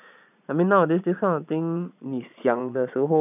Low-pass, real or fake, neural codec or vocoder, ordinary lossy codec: 3.6 kHz; fake; vocoder, 22.05 kHz, 80 mel bands, Vocos; none